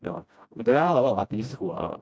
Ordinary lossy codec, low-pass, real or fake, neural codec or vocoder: none; none; fake; codec, 16 kHz, 1 kbps, FreqCodec, smaller model